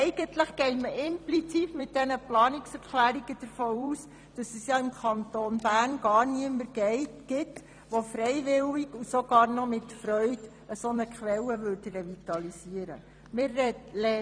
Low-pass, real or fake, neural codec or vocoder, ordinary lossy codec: 9.9 kHz; fake; vocoder, 44.1 kHz, 128 mel bands every 256 samples, BigVGAN v2; none